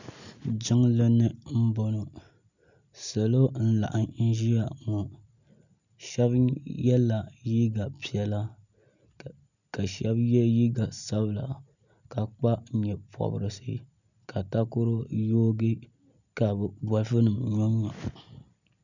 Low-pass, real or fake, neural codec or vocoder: 7.2 kHz; real; none